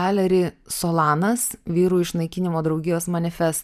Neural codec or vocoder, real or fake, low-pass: vocoder, 44.1 kHz, 128 mel bands every 512 samples, BigVGAN v2; fake; 14.4 kHz